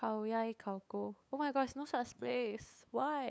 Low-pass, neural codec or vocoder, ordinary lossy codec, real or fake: none; codec, 16 kHz, 8 kbps, FunCodec, trained on LibriTTS, 25 frames a second; none; fake